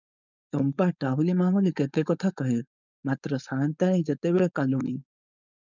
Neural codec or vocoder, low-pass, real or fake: codec, 16 kHz, 4.8 kbps, FACodec; 7.2 kHz; fake